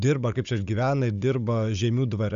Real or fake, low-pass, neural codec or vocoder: fake; 7.2 kHz; codec, 16 kHz, 16 kbps, FunCodec, trained on Chinese and English, 50 frames a second